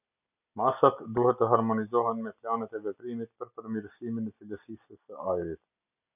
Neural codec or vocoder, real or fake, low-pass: none; real; 3.6 kHz